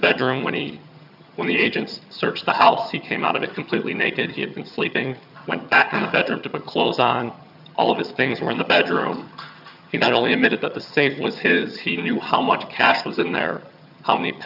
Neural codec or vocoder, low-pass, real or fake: vocoder, 22.05 kHz, 80 mel bands, HiFi-GAN; 5.4 kHz; fake